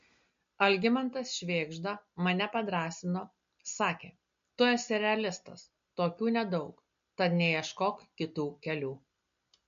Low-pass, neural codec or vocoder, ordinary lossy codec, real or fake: 7.2 kHz; none; MP3, 48 kbps; real